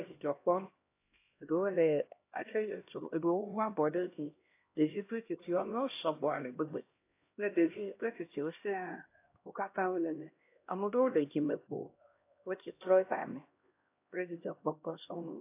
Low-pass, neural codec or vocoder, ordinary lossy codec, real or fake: 3.6 kHz; codec, 16 kHz, 1 kbps, X-Codec, HuBERT features, trained on LibriSpeech; AAC, 24 kbps; fake